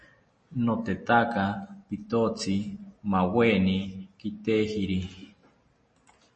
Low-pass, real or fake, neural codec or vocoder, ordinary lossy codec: 10.8 kHz; real; none; MP3, 32 kbps